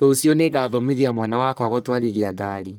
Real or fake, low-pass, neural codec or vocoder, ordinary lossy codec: fake; none; codec, 44.1 kHz, 1.7 kbps, Pupu-Codec; none